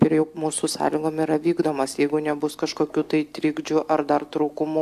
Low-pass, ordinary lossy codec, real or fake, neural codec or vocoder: 14.4 kHz; AAC, 96 kbps; real; none